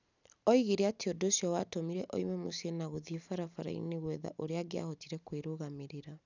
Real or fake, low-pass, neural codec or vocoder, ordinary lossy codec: real; 7.2 kHz; none; none